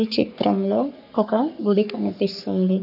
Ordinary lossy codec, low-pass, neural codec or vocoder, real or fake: none; 5.4 kHz; codec, 44.1 kHz, 3.4 kbps, Pupu-Codec; fake